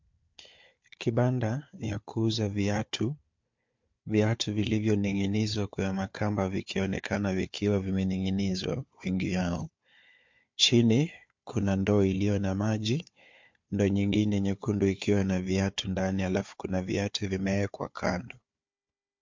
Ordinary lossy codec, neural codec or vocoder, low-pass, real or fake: MP3, 48 kbps; codec, 16 kHz, 4 kbps, FunCodec, trained on Chinese and English, 50 frames a second; 7.2 kHz; fake